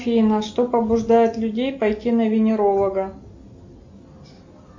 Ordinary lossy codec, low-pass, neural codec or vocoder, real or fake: MP3, 48 kbps; 7.2 kHz; none; real